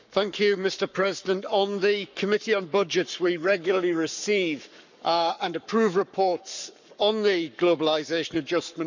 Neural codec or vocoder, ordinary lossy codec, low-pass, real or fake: codec, 44.1 kHz, 7.8 kbps, Pupu-Codec; none; 7.2 kHz; fake